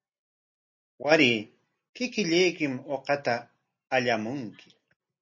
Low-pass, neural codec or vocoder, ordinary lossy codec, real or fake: 7.2 kHz; none; MP3, 32 kbps; real